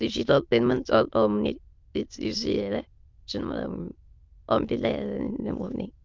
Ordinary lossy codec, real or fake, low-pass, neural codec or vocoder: Opus, 24 kbps; fake; 7.2 kHz; autoencoder, 22.05 kHz, a latent of 192 numbers a frame, VITS, trained on many speakers